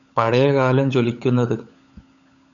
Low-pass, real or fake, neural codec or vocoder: 7.2 kHz; fake; codec, 16 kHz, 16 kbps, FunCodec, trained on LibriTTS, 50 frames a second